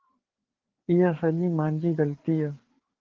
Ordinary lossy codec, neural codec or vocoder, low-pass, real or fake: Opus, 16 kbps; codec, 16 kHz, 4 kbps, FreqCodec, larger model; 7.2 kHz; fake